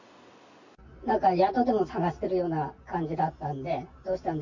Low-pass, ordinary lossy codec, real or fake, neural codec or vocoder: 7.2 kHz; none; real; none